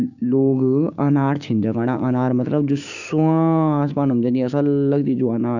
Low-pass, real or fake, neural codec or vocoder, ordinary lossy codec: 7.2 kHz; fake; codec, 16 kHz, 6 kbps, DAC; none